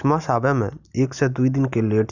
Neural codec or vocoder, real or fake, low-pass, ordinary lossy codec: none; real; 7.2 kHz; none